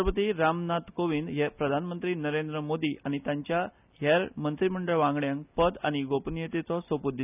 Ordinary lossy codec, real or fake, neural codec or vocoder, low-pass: none; real; none; 3.6 kHz